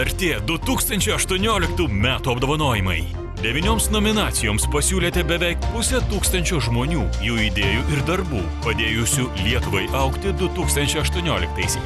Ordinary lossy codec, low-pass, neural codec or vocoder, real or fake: Opus, 64 kbps; 14.4 kHz; none; real